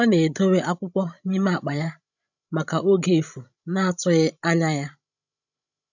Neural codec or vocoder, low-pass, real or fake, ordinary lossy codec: codec, 16 kHz, 16 kbps, FreqCodec, larger model; 7.2 kHz; fake; none